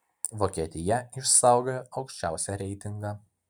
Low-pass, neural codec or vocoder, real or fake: 19.8 kHz; none; real